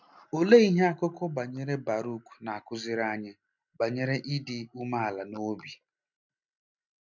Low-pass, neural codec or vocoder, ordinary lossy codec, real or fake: 7.2 kHz; none; none; real